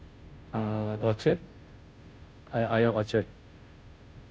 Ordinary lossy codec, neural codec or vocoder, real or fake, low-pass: none; codec, 16 kHz, 0.5 kbps, FunCodec, trained on Chinese and English, 25 frames a second; fake; none